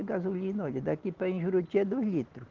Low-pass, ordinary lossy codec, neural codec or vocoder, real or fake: 7.2 kHz; Opus, 16 kbps; none; real